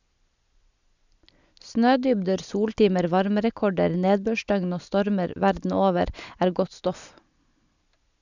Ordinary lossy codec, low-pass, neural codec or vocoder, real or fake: none; 7.2 kHz; none; real